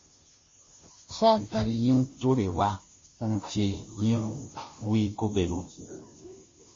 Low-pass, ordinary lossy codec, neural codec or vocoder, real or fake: 7.2 kHz; MP3, 32 kbps; codec, 16 kHz, 0.5 kbps, FunCodec, trained on Chinese and English, 25 frames a second; fake